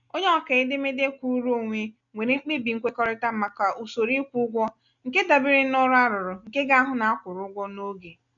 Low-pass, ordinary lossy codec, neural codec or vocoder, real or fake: 7.2 kHz; none; none; real